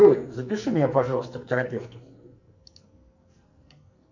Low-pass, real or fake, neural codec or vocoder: 7.2 kHz; fake; codec, 44.1 kHz, 2.6 kbps, SNAC